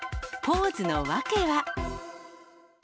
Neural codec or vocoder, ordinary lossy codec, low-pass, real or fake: none; none; none; real